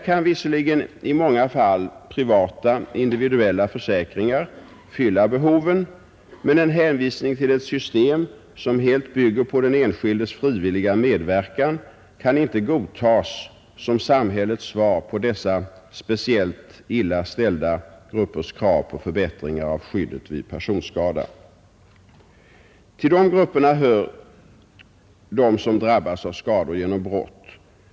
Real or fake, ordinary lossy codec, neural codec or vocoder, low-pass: real; none; none; none